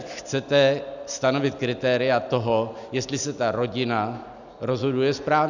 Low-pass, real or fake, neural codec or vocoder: 7.2 kHz; real; none